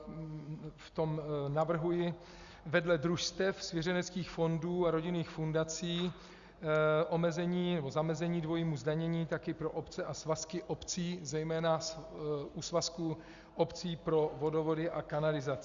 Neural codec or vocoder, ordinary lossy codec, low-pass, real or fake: none; Opus, 64 kbps; 7.2 kHz; real